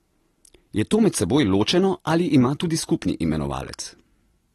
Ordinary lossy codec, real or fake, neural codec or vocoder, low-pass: AAC, 32 kbps; real; none; 14.4 kHz